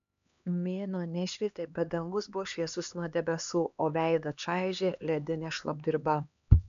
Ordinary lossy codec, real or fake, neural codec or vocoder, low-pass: MP3, 96 kbps; fake; codec, 16 kHz, 2 kbps, X-Codec, HuBERT features, trained on LibriSpeech; 7.2 kHz